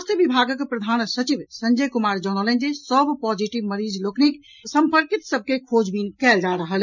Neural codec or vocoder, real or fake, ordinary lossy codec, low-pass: none; real; none; 7.2 kHz